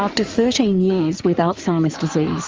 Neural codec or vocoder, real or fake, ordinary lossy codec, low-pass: codec, 16 kHz in and 24 kHz out, 2.2 kbps, FireRedTTS-2 codec; fake; Opus, 24 kbps; 7.2 kHz